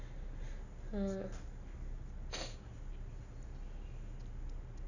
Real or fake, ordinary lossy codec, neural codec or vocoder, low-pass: real; none; none; 7.2 kHz